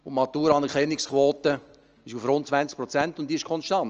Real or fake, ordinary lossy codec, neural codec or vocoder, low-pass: real; Opus, 24 kbps; none; 7.2 kHz